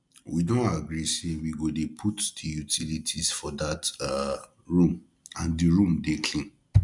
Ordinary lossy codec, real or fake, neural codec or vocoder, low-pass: none; real; none; 10.8 kHz